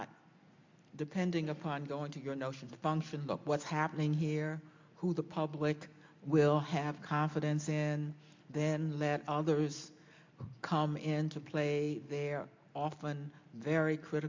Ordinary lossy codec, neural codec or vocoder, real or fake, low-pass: MP3, 64 kbps; none; real; 7.2 kHz